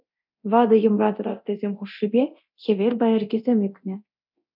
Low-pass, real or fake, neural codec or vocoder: 5.4 kHz; fake; codec, 24 kHz, 0.9 kbps, DualCodec